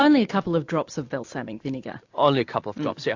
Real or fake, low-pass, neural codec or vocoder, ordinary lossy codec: real; 7.2 kHz; none; AAC, 48 kbps